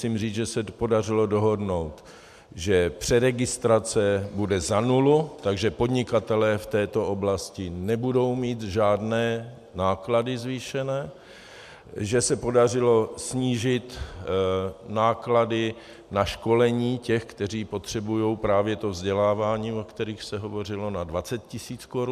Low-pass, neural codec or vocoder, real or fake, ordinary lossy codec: 14.4 kHz; none; real; MP3, 96 kbps